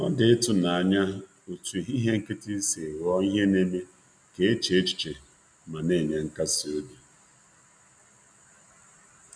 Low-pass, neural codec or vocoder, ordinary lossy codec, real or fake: 9.9 kHz; none; none; real